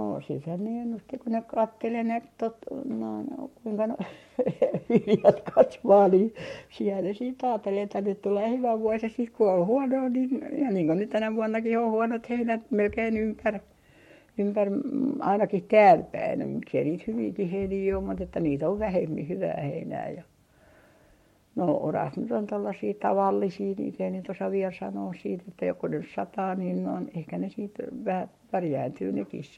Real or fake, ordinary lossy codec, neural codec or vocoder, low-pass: fake; MP3, 64 kbps; codec, 44.1 kHz, 7.8 kbps, Pupu-Codec; 19.8 kHz